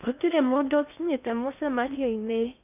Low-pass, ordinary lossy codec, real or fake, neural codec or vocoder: 3.6 kHz; none; fake; codec, 16 kHz in and 24 kHz out, 0.6 kbps, FocalCodec, streaming, 2048 codes